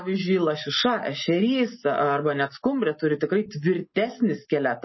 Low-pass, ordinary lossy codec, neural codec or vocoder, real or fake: 7.2 kHz; MP3, 24 kbps; none; real